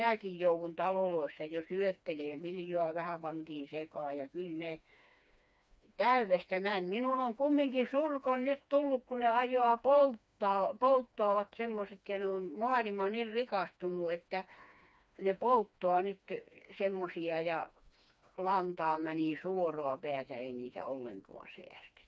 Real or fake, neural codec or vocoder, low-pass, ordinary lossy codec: fake; codec, 16 kHz, 2 kbps, FreqCodec, smaller model; none; none